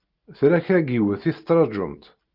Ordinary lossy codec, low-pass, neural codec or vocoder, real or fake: Opus, 24 kbps; 5.4 kHz; none; real